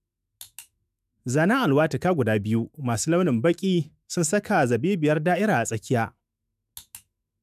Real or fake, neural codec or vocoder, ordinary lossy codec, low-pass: fake; autoencoder, 48 kHz, 128 numbers a frame, DAC-VAE, trained on Japanese speech; none; 14.4 kHz